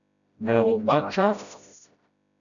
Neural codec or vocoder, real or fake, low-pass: codec, 16 kHz, 0.5 kbps, FreqCodec, smaller model; fake; 7.2 kHz